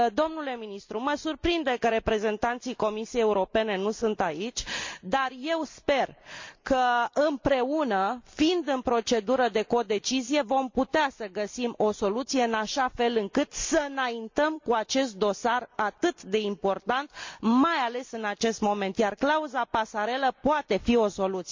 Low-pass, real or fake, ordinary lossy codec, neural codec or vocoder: 7.2 kHz; real; MP3, 64 kbps; none